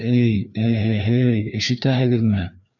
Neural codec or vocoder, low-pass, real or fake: codec, 16 kHz, 2 kbps, FreqCodec, larger model; 7.2 kHz; fake